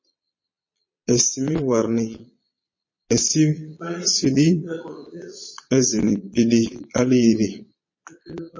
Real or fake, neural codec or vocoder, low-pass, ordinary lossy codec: fake; vocoder, 22.05 kHz, 80 mel bands, Vocos; 7.2 kHz; MP3, 32 kbps